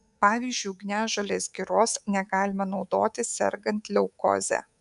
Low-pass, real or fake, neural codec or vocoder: 10.8 kHz; fake; codec, 24 kHz, 3.1 kbps, DualCodec